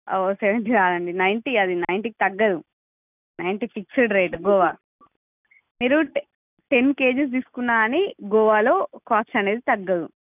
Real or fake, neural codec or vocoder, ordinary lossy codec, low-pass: real; none; none; 3.6 kHz